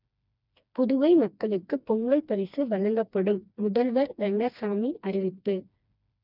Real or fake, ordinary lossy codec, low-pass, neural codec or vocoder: fake; none; 5.4 kHz; codec, 16 kHz, 2 kbps, FreqCodec, smaller model